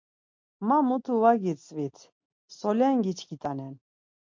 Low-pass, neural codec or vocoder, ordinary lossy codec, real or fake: 7.2 kHz; none; MP3, 48 kbps; real